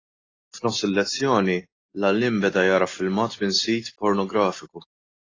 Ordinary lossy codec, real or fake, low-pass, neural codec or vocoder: AAC, 32 kbps; real; 7.2 kHz; none